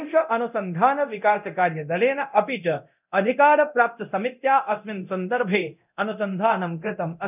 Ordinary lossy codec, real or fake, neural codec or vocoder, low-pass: none; fake; codec, 24 kHz, 0.9 kbps, DualCodec; 3.6 kHz